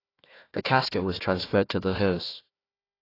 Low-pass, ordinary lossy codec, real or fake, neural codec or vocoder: 5.4 kHz; AAC, 24 kbps; fake; codec, 16 kHz, 1 kbps, FunCodec, trained on Chinese and English, 50 frames a second